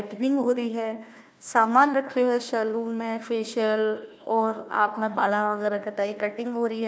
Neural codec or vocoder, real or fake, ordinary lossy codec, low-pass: codec, 16 kHz, 1 kbps, FunCodec, trained on Chinese and English, 50 frames a second; fake; none; none